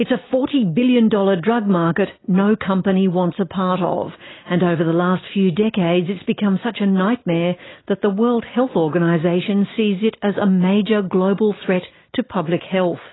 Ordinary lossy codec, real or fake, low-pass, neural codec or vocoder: AAC, 16 kbps; real; 7.2 kHz; none